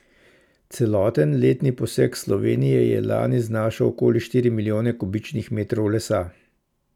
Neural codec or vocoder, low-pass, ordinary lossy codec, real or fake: none; 19.8 kHz; none; real